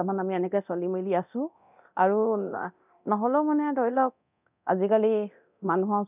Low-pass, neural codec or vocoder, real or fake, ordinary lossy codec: 3.6 kHz; codec, 24 kHz, 0.9 kbps, DualCodec; fake; none